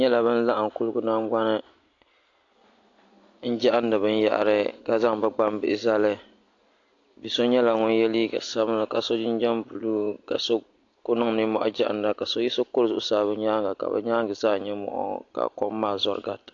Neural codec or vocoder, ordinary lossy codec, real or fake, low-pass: none; AAC, 48 kbps; real; 7.2 kHz